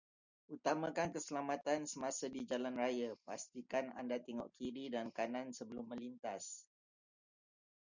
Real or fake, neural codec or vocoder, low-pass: real; none; 7.2 kHz